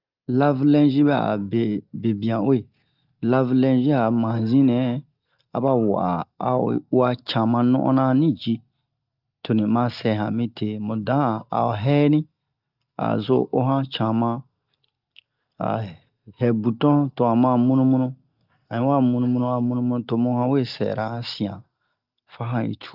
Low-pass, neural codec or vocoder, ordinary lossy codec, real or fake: 5.4 kHz; none; Opus, 32 kbps; real